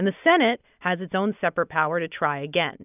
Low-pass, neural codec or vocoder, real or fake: 3.6 kHz; none; real